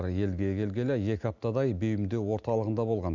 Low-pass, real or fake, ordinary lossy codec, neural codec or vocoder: 7.2 kHz; real; none; none